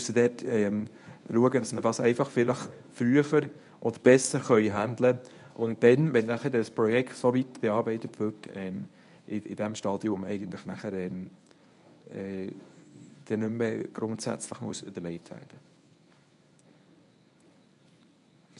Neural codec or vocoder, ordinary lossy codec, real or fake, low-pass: codec, 24 kHz, 0.9 kbps, WavTokenizer, medium speech release version 1; none; fake; 10.8 kHz